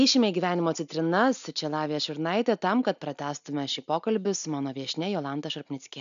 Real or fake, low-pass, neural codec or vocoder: real; 7.2 kHz; none